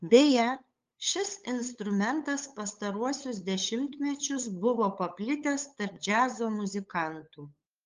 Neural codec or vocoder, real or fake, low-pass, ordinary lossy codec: codec, 16 kHz, 8 kbps, FunCodec, trained on LibriTTS, 25 frames a second; fake; 7.2 kHz; Opus, 32 kbps